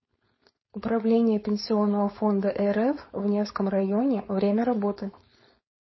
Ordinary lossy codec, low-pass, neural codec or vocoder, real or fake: MP3, 24 kbps; 7.2 kHz; codec, 16 kHz, 4.8 kbps, FACodec; fake